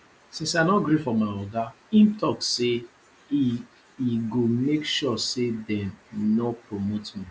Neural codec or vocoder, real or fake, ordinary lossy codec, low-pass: none; real; none; none